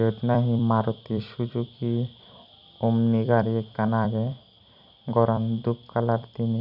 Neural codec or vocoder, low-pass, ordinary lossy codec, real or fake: vocoder, 44.1 kHz, 128 mel bands every 256 samples, BigVGAN v2; 5.4 kHz; none; fake